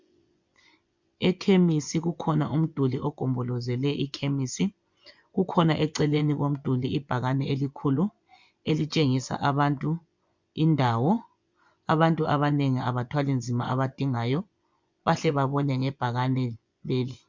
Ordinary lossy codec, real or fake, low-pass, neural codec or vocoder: MP3, 64 kbps; fake; 7.2 kHz; vocoder, 24 kHz, 100 mel bands, Vocos